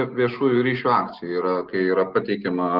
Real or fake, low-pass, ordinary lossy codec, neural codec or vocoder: real; 5.4 kHz; Opus, 16 kbps; none